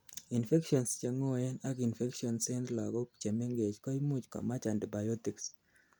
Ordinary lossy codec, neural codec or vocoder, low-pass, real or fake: none; none; none; real